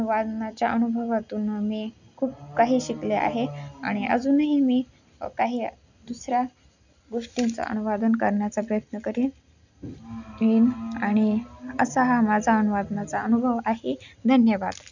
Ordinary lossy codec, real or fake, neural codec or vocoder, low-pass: none; real; none; 7.2 kHz